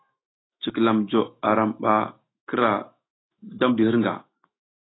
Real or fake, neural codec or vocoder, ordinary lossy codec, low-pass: fake; autoencoder, 48 kHz, 128 numbers a frame, DAC-VAE, trained on Japanese speech; AAC, 16 kbps; 7.2 kHz